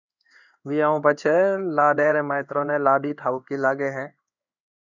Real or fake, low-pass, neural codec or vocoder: fake; 7.2 kHz; codec, 16 kHz in and 24 kHz out, 1 kbps, XY-Tokenizer